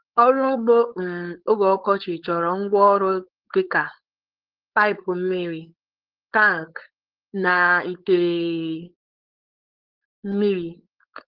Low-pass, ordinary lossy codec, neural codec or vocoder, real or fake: 5.4 kHz; Opus, 16 kbps; codec, 16 kHz, 4.8 kbps, FACodec; fake